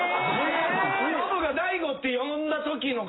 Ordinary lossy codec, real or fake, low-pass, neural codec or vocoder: AAC, 16 kbps; real; 7.2 kHz; none